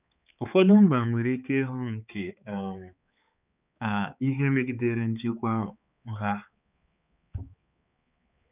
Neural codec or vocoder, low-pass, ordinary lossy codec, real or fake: codec, 16 kHz, 4 kbps, X-Codec, HuBERT features, trained on balanced general audio; 3.6 kHz; none; fake